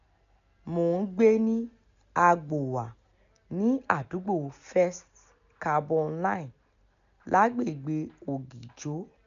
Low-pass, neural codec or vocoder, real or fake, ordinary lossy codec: 7.2 kHz; none; real; none